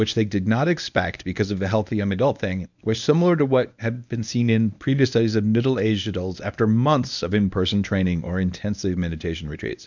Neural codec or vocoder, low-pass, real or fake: codec, 24 kHz, 0.9 kbps, WavTokenizer, medium speech release version 1; 7.2 kHz; fake